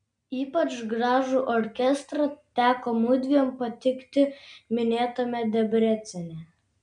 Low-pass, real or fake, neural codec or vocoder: 9.9 kHz; real; none